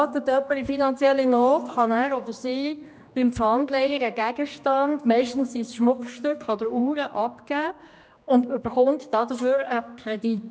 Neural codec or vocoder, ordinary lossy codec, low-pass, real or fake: codec, 16 kHz, 2 kbps, X-Codec, HuBERT features, trained on general audio; none; none; fake